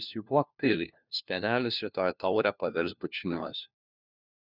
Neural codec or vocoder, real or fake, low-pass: codec, 16 kHz, 1 kbps, FunCodec, trained on LibriTTS, 50 frames a second; fake; 5.4 kHz